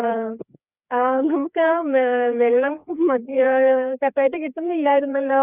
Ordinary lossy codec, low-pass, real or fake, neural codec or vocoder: none; 3.6 kHz; fake; codec, 16 kHz, 2 kbps, FreqCodec, larger model